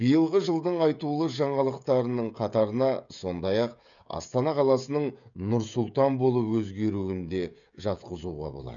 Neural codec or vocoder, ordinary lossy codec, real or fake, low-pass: codec, 16 kHz, 16 kbps, FreqCodec, smaller model; none; fake; 7.2 kHz